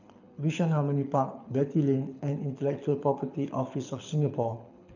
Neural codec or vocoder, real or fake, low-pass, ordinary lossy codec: codec, 24 kHz, 6 kbps, HILCodec; fake; 7.2 kHz; none